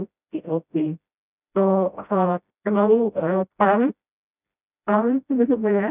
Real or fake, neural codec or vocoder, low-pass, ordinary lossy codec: fake; codec, 16 kHz, 0.5 kbps, FreqCodec, smaller model; 3.6 kHz; none